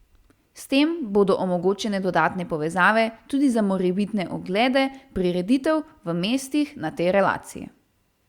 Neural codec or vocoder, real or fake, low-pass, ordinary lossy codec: none; real; 19.8 kHz; Opus, 64 kbps